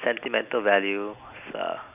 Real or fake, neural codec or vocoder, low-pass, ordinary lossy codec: real; none; 3.6 kHz; none